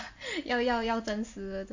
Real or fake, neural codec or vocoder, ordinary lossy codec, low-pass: real; none; AAC, 48 kbps; 7.2 kHz